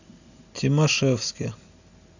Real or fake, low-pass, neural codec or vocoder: real; 7.2 kHz; none